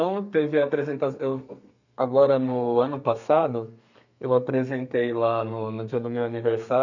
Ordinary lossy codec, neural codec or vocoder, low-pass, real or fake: none; codec, 32 kHz, 1.9 kbps, SNAC; 7.2 kHz; fake